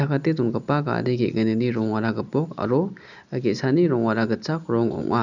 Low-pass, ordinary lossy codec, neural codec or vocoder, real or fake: 7.2 kHz; none; none; real